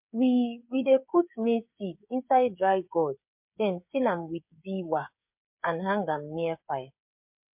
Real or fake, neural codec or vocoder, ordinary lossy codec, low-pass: fake; codec, 16 kHz, 8 kbps, FreqCodec, smaller model; MP3, 32 kbps; 3.6 kHz